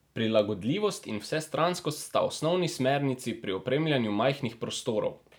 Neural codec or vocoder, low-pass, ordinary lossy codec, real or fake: none; none; none; real